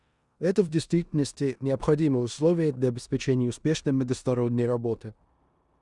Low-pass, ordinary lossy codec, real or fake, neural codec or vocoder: 10.8 kHz; Opus, 64 kbps; fake; codec, 16 kHz in and 24 kHz out, 0.9 kbps, LongCat-Audio-Codec, four codebook decoder